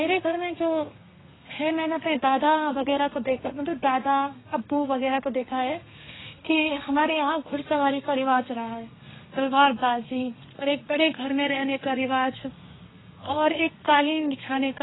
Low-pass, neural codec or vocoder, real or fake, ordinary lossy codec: 7.2 kHz; codec, 32 kHz, 1.9 kbps, SNAC; fake; AAC, 16 kbps